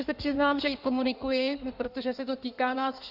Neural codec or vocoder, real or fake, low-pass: codec, 16 kHz in and 24 kHz out, 1.1 kbps, FireRedTTS-2 codec; fake; 5.4 kHz